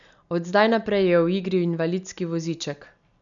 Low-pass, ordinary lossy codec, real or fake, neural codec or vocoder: 7.2 kHz; none; real; none